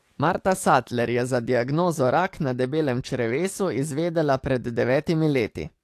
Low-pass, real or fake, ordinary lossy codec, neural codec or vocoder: 14.4 kHz; fake; AAC, 64 kbps; codec, 44.1 kHz, 7.8 kbps, DAC